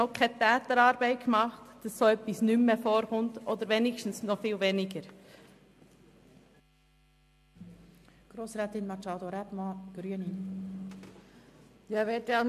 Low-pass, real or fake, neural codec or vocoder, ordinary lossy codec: 14.4 kHz; real; none; MP3, 64 kbps